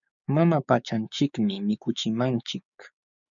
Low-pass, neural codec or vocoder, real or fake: 7.2 kHz; codec, 16 kHz, 6 kbps, DAC; fake